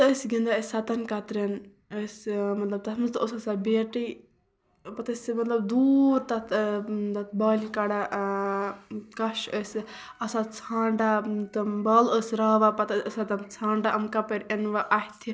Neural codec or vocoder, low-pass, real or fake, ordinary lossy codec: none; none; real; none